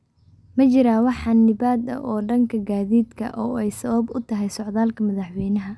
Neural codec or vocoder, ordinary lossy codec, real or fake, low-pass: none; none; real; none